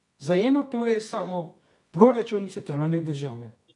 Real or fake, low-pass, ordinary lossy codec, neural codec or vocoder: fake; 10.8 kHz; none; codec, 24 kHz, 0.9 kbps, WavTokenizer, medium music audio release